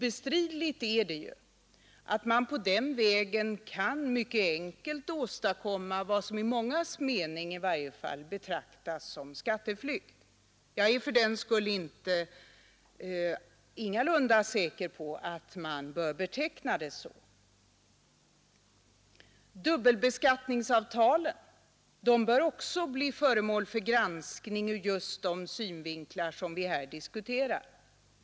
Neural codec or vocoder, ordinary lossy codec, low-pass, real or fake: none; none; none; real